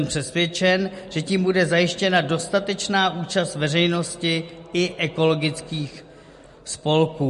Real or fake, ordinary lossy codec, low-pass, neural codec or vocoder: real; MP3, 48 kbps; 14.4 kHz; none